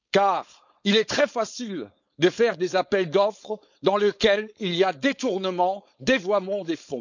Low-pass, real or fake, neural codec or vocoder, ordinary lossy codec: 7.2 kHz; fake; codec, 16 kHz, 4.8 kbps, FACodec; none